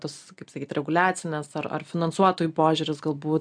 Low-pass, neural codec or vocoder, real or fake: 9.9 kHz; none; real